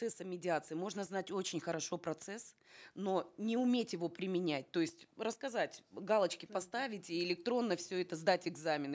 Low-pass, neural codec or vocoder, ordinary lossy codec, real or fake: none; none; none; real